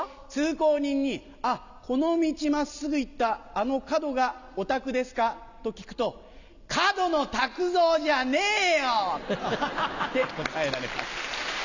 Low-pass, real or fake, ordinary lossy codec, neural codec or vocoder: 7.2 kHz; real; none; none